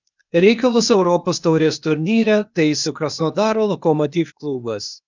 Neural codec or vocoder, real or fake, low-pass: codec, 16 kHz, 0.8 kbps, ZipCodec; fake; 7.2 kHz